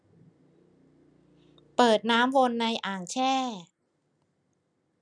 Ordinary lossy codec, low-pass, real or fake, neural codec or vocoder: none; 9.9 kHz; real; none